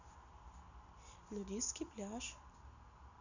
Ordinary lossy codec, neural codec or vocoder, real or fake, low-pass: none; none; real; 7.2 kHz